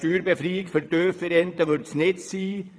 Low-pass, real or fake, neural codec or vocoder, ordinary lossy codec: none; fake; vocoder, 22.05 kHz, 80 mel bands, WaveNeXt; none